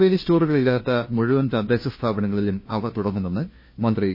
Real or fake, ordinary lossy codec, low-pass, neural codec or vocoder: fake; MP3, 24 kbps; 5.4 kHz; codec, 16 kHz, 1 kbps, FunCodec, trained on LibriTTS, 50 frames a second